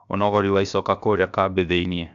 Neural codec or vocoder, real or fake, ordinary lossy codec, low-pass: codec, 16 kHz, 0.7 kbps, FocalCodec; fake; none; 7.2 kHz